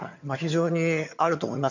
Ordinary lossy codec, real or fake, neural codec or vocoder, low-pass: none; fake; vocoder, 22.05 kHz, 80 mel bands, HiFi-GAN; 7.2 kHz